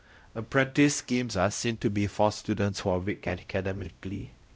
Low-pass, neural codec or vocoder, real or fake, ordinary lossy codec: none; codec, 16 kHz, 0.5 kbps, X-Codec, WavLM features, trained on Multilingual LibriSpeech; fake; none